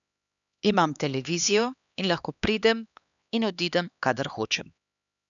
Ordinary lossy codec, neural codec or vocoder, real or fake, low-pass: none; codec, 16 kHz, 2 kbps, X-Codec, HuBERT features, trained on LibriSpeech; fake; 7.2 kHz